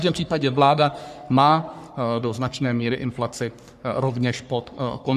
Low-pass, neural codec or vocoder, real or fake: 14.4 kHz; codec, 44.1 kHz, 3.4 kbps, Pupu-Codec; fake